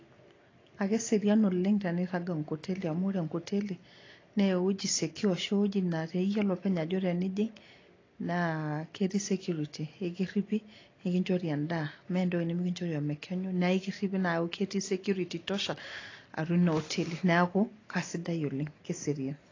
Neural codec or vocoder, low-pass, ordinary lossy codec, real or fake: none; 7.2 kHz; AAC, 32 kbps; real